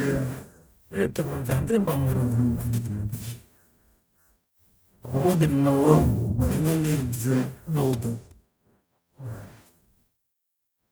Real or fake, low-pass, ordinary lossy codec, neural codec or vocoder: fake; none; none; codec, 44.1 kHz, 0.9 kbps, DAC